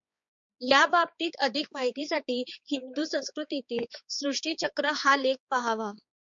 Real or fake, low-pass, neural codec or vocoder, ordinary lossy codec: fake; 7.2 kHz; codec, 16 kHz, 4 kbps, X-Codec, HuBERT features, trained on balanced general audio; MP3, 48 kbps